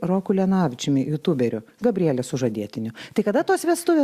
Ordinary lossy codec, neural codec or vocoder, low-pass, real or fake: Opus, 64 kbps; none; 14.4 kHz; real